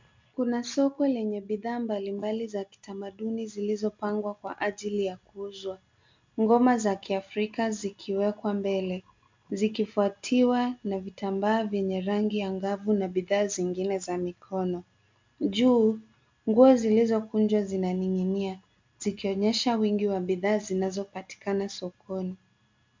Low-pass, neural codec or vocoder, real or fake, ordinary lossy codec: 7.2 kHz; none; real; MP3, 64 kbps